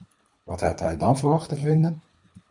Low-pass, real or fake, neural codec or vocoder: 10.8 kHz; fake; codec, 24 kHz, 3 kbps, HILCodec